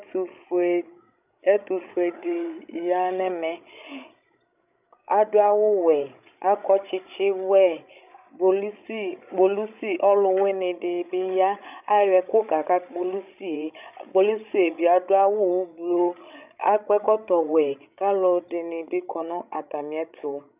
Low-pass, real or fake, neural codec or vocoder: 3.6 kHz; fake; codec, 16 kHz, 16 kbps, FreqCodec, larger model